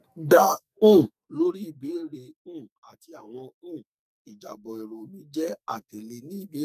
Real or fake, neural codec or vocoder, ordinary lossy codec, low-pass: fake; codec, 44.1 kHz, 2.6 kbps, SNAC; none; 14.4 kHz